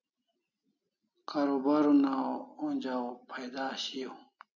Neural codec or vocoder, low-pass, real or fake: none; 7.2 kHz; real